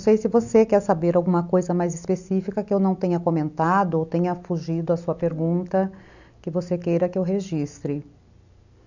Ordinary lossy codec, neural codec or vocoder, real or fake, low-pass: none; none; real; 7.2 kHz